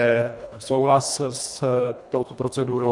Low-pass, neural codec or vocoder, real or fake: 10.8 kHz; codec, 24 kHz, 1.5 kbps, HILCodec; fake